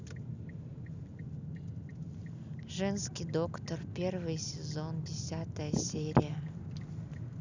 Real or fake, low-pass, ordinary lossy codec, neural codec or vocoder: real; 7.2 kHz; none; none